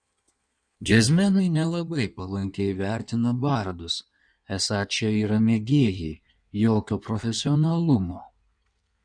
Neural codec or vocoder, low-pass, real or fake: codec, 16 kHz in and 24 kHz out, 1.1 kbps, FireRedTTS-2 codec; 9.9 kHz; fake